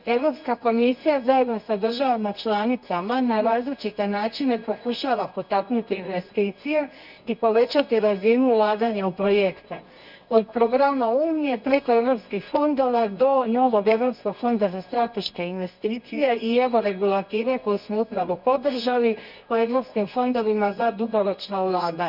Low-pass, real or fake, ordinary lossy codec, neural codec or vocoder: 5.4 kHz; fake; none; codec, 24 kHz, 0.9 kbps, WavTokenizer, medium music audio release